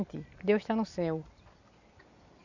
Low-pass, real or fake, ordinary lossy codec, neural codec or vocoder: 7.2 kHz; real; none; none